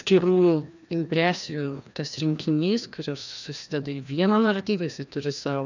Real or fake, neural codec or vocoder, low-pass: fake; codec, 16 kHz, 1 kbps, FreqCodec, larger model; 7.2 kHz